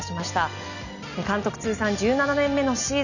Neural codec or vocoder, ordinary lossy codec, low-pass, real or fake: none; none; 7.2 kHz; real